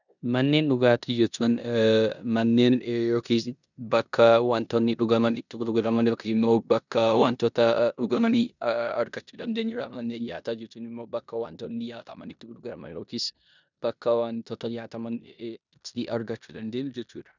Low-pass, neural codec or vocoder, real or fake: 7.2 kHz; codec, 16 kHz in and 24 kHz out, 0.9 kbps, LongCat-Audio-Codec, four codebook decoder; fake